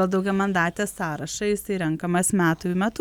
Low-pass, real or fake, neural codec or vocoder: 19.8 kHz; real; none